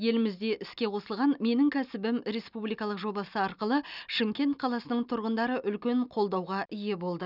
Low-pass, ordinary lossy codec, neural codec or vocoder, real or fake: 5.4 kHz; none; none; real